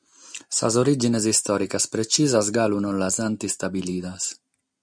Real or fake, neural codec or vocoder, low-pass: real; none; 9.9 kHz